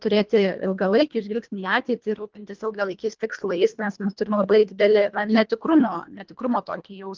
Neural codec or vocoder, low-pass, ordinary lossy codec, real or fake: codec, 24 kHz, 1.5 kbps, HILCodec; 7.2 kHz; Opus, 32 kbps; fake